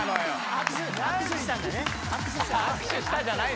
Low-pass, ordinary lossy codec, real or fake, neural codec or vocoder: none; none; real; none